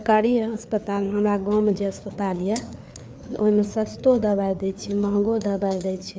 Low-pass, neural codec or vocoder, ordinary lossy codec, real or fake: none; codec, 16 kHz, 4 kbps, FreqCodec, larger model; none; fake